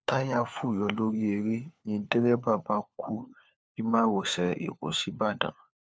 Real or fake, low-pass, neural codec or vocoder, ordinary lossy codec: fake; none; codec, 16 kHz, 4 kbps, FunCodec, trained on LibriTTS, 50 frames a second; none